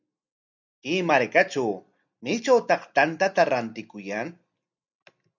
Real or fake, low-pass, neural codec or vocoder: real; 7.2 kHz; none